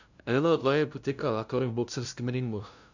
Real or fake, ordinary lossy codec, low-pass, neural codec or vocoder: fake; none; 7.2 kHz; codec, 16 kHz, 0.5 kbps, FunCodec, trained on LibriTTS, 25 frames a second